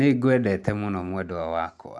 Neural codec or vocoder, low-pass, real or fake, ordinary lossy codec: none; none; real; none